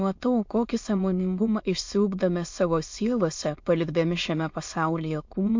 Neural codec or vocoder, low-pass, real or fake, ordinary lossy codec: autoencoder, 22.05 kHz, a latent of 192 numbers a frame, VITS, trained on many speakers; 7.2 kHz; fake; MP3, 48 kbps